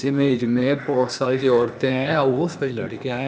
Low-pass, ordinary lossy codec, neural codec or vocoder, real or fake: none; none; codec, 16 kHz, 0.8 kbps, ZipCodec; fake